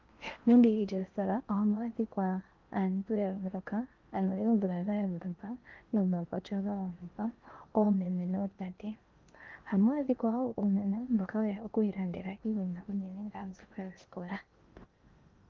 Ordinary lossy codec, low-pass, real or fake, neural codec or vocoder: Opus, 32 kbps; 7.2 kHz; fake; codec, 16 kHz in and 24 kHz out, 0.8 kbps, FocalCodec, streaming, 65536 codes